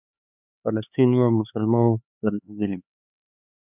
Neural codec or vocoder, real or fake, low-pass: codec, 16 kHz, 4 kbps, X-Codec, HuBERT features, trained on LibriSpeech; fake; 3.6 kHz